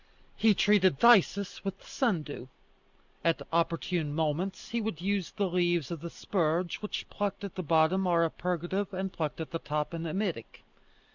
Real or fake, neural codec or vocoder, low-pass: real; none; 7.2 kHz